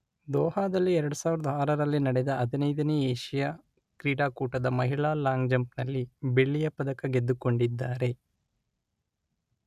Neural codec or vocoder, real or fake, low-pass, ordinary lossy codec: none; real; 14.4 kHz; none